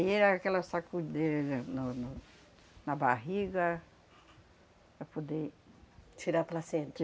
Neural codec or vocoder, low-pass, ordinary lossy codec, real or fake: none; none; none; real